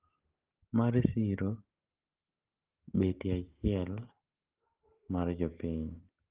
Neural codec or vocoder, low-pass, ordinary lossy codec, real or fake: none; 3.6 kHz; Opus, 16 kbps; real